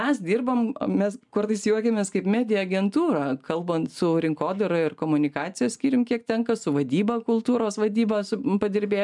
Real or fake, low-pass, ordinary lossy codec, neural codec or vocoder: real; 10.8 kHz; MP3, 96 kbps; none